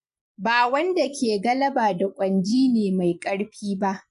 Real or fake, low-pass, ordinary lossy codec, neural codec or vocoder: fake; 10.8 kHz; none; vocoder, 24 kHz, 100 mel bands, Vocos